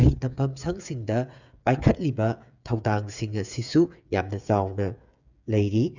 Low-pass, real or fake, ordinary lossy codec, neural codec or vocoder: 7.2 kHz; fake; none; codec, 16 kHz, 8 kbps, FreqCodec, smaller model